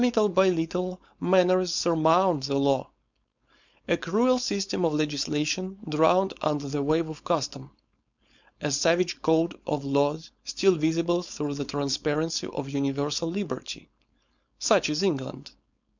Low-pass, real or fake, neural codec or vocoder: 7.2 kHz; fake; codec, 16 kHz, 4.8 kbps, FACodec